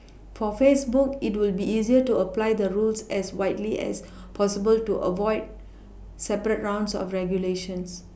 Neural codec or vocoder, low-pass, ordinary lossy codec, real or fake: none; none; none; real